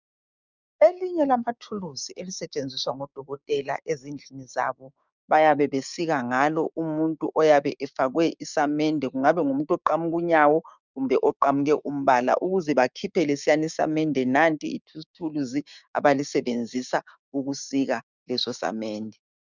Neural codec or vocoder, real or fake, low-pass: codec, 16 kHz, 6 kbps, DAC; fake; 7.2 kHz